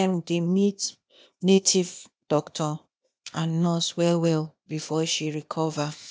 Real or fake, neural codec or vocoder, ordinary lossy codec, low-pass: fake; codec, 16 kHz, 0.8 kbps, ZipCodec; none; none